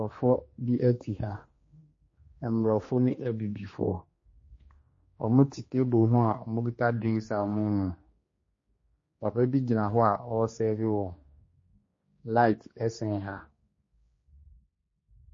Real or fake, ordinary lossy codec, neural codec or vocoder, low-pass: fake; MP3, 32 kbps; codec, 16 kHz, 2 kbps, X-Codec, HuBERT features, trained on general audio; 7.2 kHz